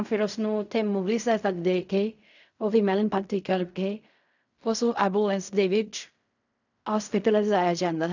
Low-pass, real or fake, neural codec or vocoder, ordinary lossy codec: 7.2 kHz; fake; codec, 16 kHz in and 24 kHz out, 0.4 kbps, LongCat-Audio-Codec, fine tuned four codebook decoder; none